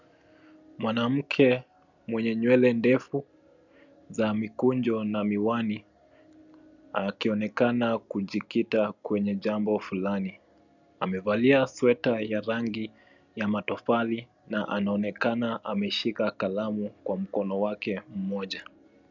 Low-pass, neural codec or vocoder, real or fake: 7.2 kHz; none; real